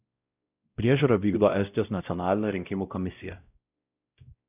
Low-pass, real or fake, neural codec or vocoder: 3.6 kHz; fake; codec, 16 kHz, 0.5 kbps, X-Codec, WavLM features, trained on Multilingual LibriSpeech